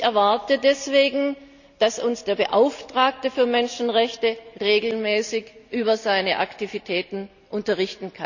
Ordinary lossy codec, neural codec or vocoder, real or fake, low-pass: none; none; real; 7.2 kHz